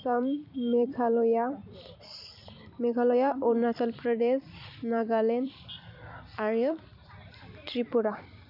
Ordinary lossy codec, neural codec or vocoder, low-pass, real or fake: none; vocoder, 44.1 kHz, 128 mel bands every 256 samples, BigVGAN v2; 5.4 kHz; fake